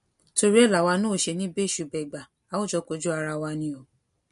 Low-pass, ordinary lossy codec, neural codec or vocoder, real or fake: 10.8 kHz; MP3, 48 kbps; none; real